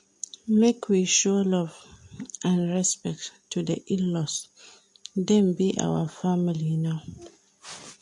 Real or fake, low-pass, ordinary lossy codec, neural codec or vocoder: real; 10.8 kHz; MP3, 48 kbps; none